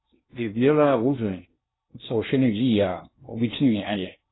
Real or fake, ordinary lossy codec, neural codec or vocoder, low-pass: fake; AAC, 16 kbps; codec, 16 kHz in and 24 kHz out, 0.6 kbps, FocalCodec, streaming, 2048 codes; 7.2 kHz